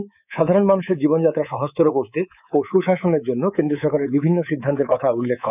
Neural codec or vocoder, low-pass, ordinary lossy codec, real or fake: codec, 24 kHz, 3.1 kbps, DualCodec; 3.6 kHz; none; fake